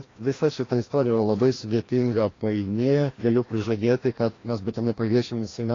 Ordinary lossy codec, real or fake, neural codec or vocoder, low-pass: AAC, 32 kbps; fake; codec, 16 kHz, 1 kbps, FreqCodec, larger model; 7.2 kHz